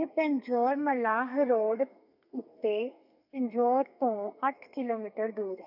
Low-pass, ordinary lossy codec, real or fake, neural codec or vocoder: 5.4 kHz; none; fake; codec, 32 kHz, 1.9 kbps, SNAC